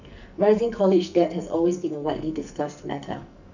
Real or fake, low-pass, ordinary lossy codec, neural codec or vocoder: fake; 7.2 kHz; none; codec, 44.1 kHz, 2.6 kbps, SNAC